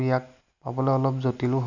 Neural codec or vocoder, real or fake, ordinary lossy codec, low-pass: none; real; none; 7.2 kHz